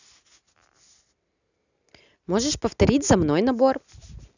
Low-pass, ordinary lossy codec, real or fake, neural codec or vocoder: 7.2 kHz; none; real; none